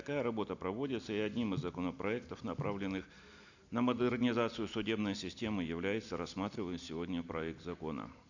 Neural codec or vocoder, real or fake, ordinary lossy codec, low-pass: none; real; none; 7.2 kHz